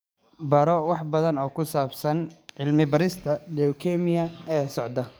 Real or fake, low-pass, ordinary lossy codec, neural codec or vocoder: fake; none; none; codec, 44.1 kHz, 7.8 kbps, DAC